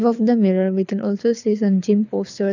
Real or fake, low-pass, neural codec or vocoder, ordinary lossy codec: fake; 7.2 kHz; codec, 24 kHz, 6 kbps, HILCodec; none